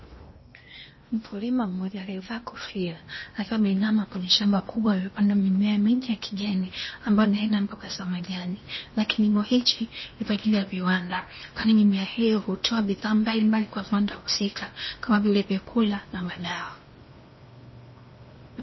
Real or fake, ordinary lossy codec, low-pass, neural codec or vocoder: fake; MP3, 24 kbps; 7.2 kHz; codec, 16 kHz in and 24 kHz out, 0.8 kbps, FocalCodec, streaming, 65536 codes